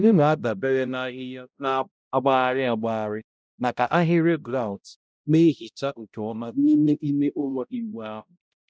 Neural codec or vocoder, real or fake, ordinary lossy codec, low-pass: codec, 16 kHz, 0.5 kbps, X-Codec, HuBERT features, trained on balanced general audio; fake; none; none